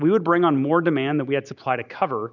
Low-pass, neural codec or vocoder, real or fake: 7.2 kHz; none; real